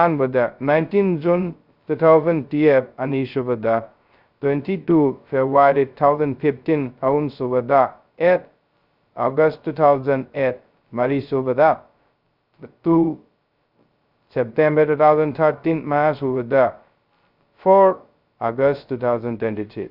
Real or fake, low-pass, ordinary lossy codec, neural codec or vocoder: fake; 5.4 kHz; Opus, 64 kbps; codec, 16 kHz, 0.2 kbps, FocalCodec